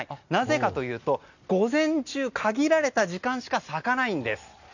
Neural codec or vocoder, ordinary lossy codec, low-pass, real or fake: none; none; 7.2 kHz; real